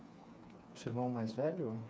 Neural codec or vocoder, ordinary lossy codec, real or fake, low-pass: codec, 16 kHz, 4 kbps, FreqCodec, smaller model; none; fake; none